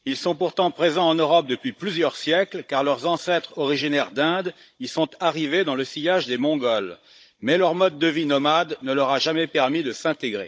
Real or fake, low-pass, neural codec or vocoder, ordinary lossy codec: fake; none; codec, 16 kHz, 16 kbps, FunCodec, trained on Chinese and English, 50 frames a second; none